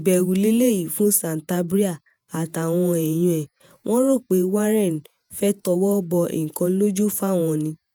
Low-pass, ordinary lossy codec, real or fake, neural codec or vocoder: none; none; fake; vocoder, 48 kHz, 128 mel bands, Vocos